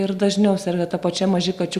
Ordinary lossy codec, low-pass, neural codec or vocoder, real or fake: Opus, 64 kbps; 14.4 kHz; none; real